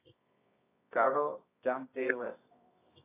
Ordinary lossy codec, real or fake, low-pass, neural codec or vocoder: AAC, 16 kbps; fake; 3.6 kHz; codec, 24 kHz, 0.9 kbps, WavTokenizer, medium music audio release